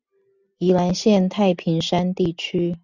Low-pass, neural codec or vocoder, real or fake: 7.2 kHz; none; real